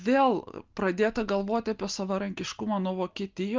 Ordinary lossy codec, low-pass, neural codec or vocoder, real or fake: Opus, 24 kbps; 7.2 kHz; none; real